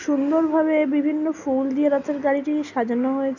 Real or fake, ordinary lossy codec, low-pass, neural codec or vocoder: real; none; 7.2 kHz; none